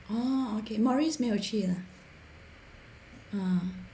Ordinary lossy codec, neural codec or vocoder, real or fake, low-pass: none; none; real; none